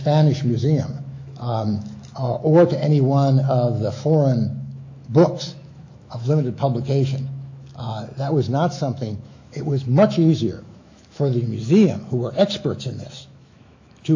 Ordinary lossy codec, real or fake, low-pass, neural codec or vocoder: AAC, 48 kbps; fake; 7.2 kHz; autoencoder, 48 kHz, 128 numbers a frame, DAC-VAE, trained on Japanese speech